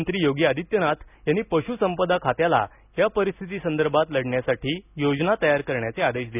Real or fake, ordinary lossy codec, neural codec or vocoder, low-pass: real; none; none; 3.6 kHz